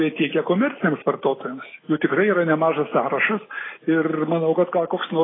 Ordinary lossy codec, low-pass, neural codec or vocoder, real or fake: AAC, 16 kbps; 7.2 kHz; none; real